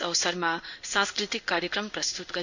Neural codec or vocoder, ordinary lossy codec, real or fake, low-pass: codec, 16 kHz in and 24 kHz out, 1 kbps, XY-Tokenizer; none; fake; 7.2 kHz